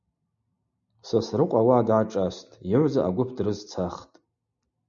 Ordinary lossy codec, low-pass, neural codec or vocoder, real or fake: MP3, 96 kbps; 7.2 kHz; none; real